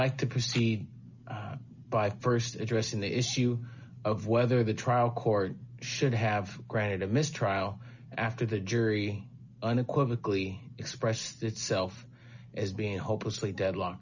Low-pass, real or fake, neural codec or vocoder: 7.2 kHz; real; none